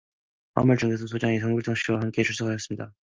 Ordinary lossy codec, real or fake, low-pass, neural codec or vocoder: Opus, 32 kbps; real; 7.2 kHz; none